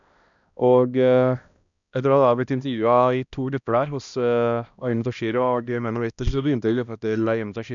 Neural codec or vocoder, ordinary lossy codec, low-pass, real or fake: codec, 16 kHz, 1 kbps, X-Codec, HuBERT features, trained on balanced general audio; none; 7.2 kHz; fake